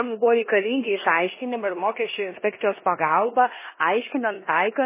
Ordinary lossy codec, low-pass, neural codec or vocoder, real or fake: MP3, 16 kbps; 3.6 kHz; codec, 16 kHz in and 24 kHz out, 0.9 kbps, LongCat-Audio-Codec, four codebook decoder; fake